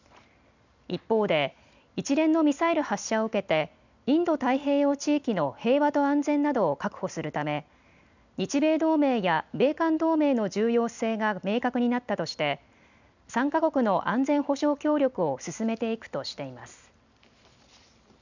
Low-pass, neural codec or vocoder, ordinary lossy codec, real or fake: 7.2 kHz; none; none; real